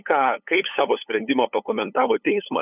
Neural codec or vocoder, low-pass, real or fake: codec, 16 kHz, 8 kbps, FunCodec, trained on LibriTTS, 25 frames a second; 3.6 kHz; fake